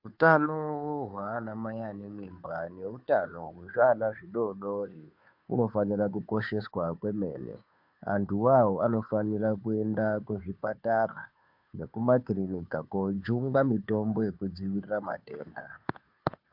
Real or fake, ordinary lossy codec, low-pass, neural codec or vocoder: fake; MP3, 48 kbps; 5.4 kHz; codec, 16 kHz, 2 kbps, FunCodec, trained on Chinese and English, 25 frames a second